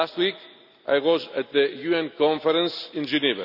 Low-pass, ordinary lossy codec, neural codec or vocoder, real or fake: 5.4 kHz; none; none; real